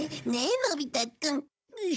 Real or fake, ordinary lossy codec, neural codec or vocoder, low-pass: fake; none; codec, 16 kHz, 16 kbps, FunCodec, trained on Chinese and English, 50 frames a second; none